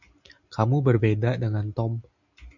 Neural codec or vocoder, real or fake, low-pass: none; real; 7.2 kHz